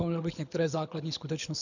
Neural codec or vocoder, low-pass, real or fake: codec, 24 kHz, 6 kbps, HILCodec; 7.2 kHz; fake